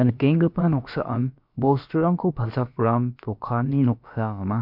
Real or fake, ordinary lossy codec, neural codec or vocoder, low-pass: fake; MP3, 48 kbps; codec, 16 kHz, about 1 kbps, DyCAST, with the encoder's durations; 5.4 kHz